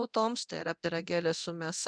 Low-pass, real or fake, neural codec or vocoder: 9.9 kHz; fake; codec, 24 kHz, 0.9 kbps, DualCodec